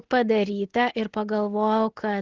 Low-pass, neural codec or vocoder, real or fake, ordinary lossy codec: 7.2 kHz; none; real; Opus, 16 kbps